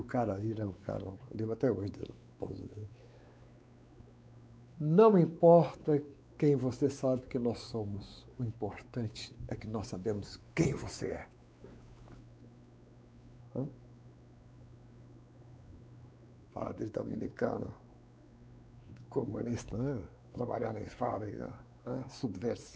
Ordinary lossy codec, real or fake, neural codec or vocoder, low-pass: none; fake; codec, 16 kHz, 4 kbps, X-Codec, WavLM features, trained on Multilingual LibriSpeech; none